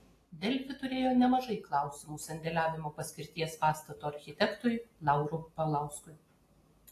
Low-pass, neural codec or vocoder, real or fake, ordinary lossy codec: 14.4 kHz; none; real; AAC, 48 kbps